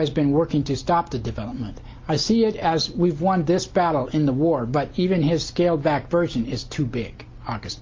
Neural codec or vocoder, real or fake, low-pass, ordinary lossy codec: none; real; 7.2 kHz; Opus, 24 kbps